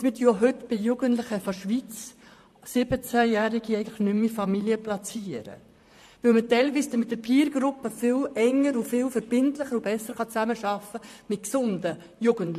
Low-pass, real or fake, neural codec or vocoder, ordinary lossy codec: 14.4 kHz; fake; vocoder, 44.1 kHz, 128 mel bands, Pupu-Vocoder; MP3, 64 kbps